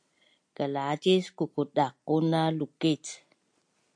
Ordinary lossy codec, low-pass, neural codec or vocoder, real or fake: AAC, 64 kbps; 9.9 kHz; none; real